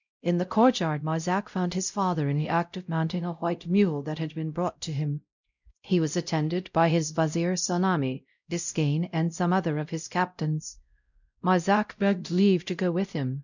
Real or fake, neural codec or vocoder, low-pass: fake; codec, 16 kHz, 0.5 kbps, X-Codec, WavLM features, trained on Multilingual LibriSpeech; 7.2 kHz